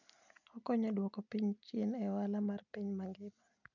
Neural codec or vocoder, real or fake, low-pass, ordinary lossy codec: none; real; 7.2 kHz; none